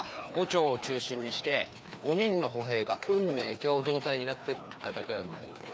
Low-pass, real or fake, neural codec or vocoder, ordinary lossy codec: none; fake; codec, 16 kHz, 2 kbps, FreqCodec, larger model; none